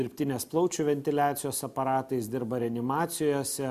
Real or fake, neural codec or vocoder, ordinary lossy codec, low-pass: real; none; MP3, 96 kbps; 14.4 kHz